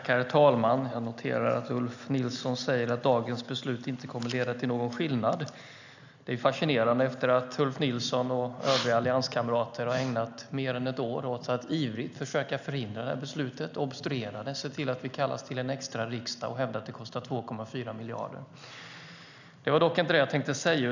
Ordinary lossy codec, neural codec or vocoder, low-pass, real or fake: none; none; 7.2 kHz; real